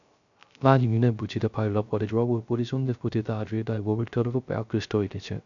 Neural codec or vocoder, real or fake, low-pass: codec, 16 kHz, 0.3 kbps, FocalCodec; fake; 7.2 kHz